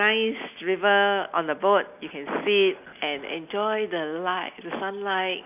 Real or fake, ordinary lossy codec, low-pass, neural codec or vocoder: real; none; 3.6 kHz; none